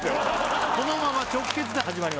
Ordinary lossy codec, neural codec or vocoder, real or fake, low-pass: none; none; real; none